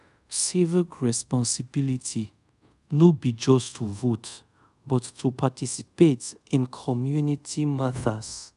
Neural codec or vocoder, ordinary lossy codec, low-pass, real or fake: codec, 24 kHz, 0.5 kbps, DualCodec; none; 10.8 kHz; fake